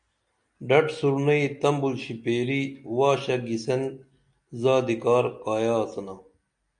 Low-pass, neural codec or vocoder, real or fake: 9.9 kHz; none; real